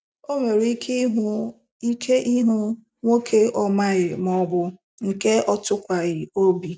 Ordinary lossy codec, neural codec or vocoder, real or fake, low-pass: none; none; real; none